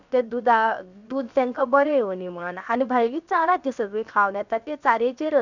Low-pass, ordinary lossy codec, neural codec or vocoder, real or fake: 7.2 kHz; none; codec, 16 kHz, 0.7 kbps, FocalCodec; fake